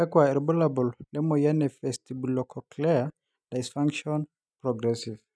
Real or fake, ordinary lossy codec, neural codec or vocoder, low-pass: real; none; none; none